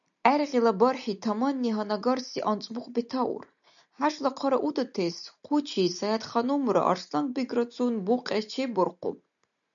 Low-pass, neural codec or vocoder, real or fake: 7.2 kHz; none; real